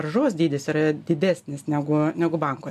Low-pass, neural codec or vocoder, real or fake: 14.4 kHz; none; real